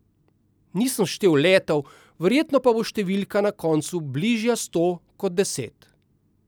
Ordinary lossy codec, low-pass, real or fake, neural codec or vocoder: none; none; real; none